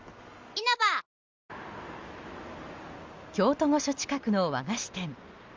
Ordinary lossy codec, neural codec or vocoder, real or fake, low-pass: Opus, 32 kbps; none; real; 7.2 kHz